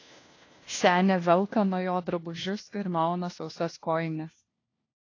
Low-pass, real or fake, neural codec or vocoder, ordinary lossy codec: 7.2 kHz; fake; codec, 16 kHz, 1 kbps, FunCodec, trained on LibriTTS, 50 frames a second; AAC, 32 kbps